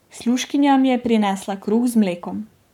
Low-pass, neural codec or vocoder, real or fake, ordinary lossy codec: 19.8 kHz; codec, 44.1 kHz, 7.8 kbps, Pupu-Codec; fake; none